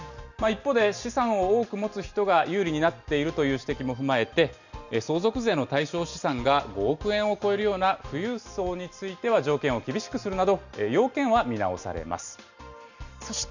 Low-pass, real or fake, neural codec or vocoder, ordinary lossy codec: 7.2 kHz; real; none; none